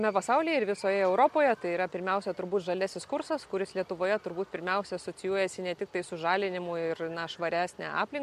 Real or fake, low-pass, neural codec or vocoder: real; 14.4 kHz; none